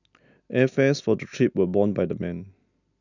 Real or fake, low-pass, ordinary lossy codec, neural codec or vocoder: real; 7.2 kHz; none; none